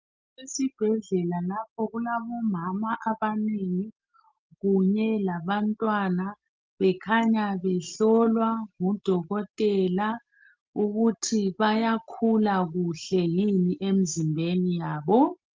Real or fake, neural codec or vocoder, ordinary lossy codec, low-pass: real; none; Opus, 32 kbps; 7.2 kHz